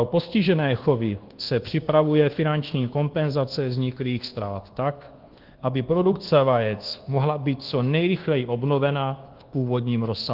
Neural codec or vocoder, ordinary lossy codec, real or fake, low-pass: codec, 24 kHz, 1.2 kbps, DualCodec; Opus, 16 kbps; fake; 5.4 kHz